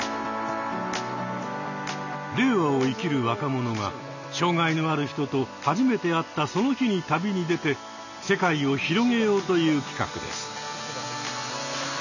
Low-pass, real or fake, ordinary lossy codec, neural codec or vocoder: 7.2 kHz; real; none; none